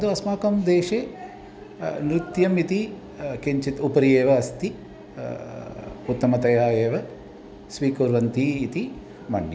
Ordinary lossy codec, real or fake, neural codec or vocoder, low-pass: none; real; none; none